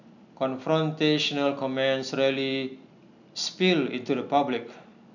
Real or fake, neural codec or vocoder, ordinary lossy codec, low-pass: real; none; none; 7.2 kHz